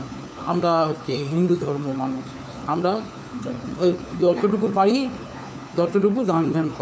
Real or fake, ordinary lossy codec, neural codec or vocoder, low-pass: fake; none; codec, 16 kHz, 4 kbps, FunCodec, trained on LibriTTS, 50 frames a second; none